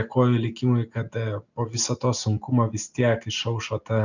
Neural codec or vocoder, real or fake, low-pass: none; real; 7.2 kHz